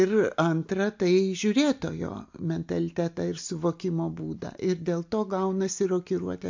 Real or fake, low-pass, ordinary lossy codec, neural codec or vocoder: real; 7.2 kHz; MP3, 48 kbps; none